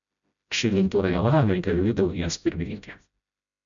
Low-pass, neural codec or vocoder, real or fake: 7.2 kHz; codec, 16 kHz, 0.5 kbps, FreqCodec, smaller model; fake